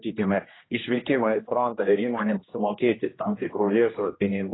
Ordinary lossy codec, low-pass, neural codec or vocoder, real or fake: AAC, 16 kbps; 7.2 kHz; codec, 16 kHz, 1 kbps, X-Codec, HuBERT features, trained on general audio; fake